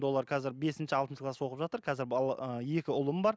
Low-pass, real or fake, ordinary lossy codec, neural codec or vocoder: none; real; none; none